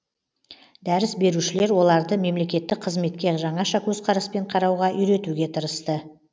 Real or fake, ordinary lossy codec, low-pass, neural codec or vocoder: real; none; none; none